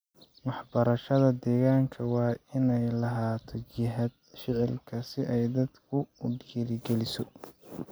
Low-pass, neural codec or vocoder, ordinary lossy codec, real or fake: none; none; none; real